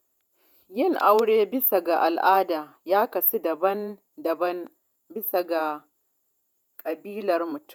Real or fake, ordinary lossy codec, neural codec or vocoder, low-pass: fake; none; vocoder, 48 kHz, 128 mel bands, Vocos; none